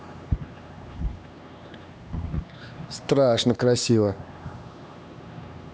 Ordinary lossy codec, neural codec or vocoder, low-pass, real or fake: none; codec, 16 kHz, 2 kbps, X-Codec, HuBERT features, trained on LibriSpeech; none; fake